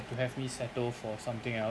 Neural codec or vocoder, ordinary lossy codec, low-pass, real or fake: none; none; none; real